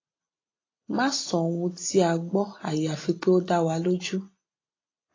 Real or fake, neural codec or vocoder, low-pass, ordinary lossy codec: real; none; 7.2 kHz; AAC, 32 kbps